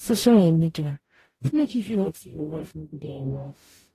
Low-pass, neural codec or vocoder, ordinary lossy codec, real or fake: 14.4 kHz; codec, 44.1 kHz, 0.9 kbps, DAC; AAC, 48 kbps; fake